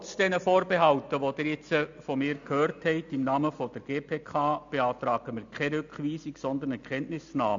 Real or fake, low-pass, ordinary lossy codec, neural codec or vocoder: real; 7.2 kHz; AAC, 64 kbps; none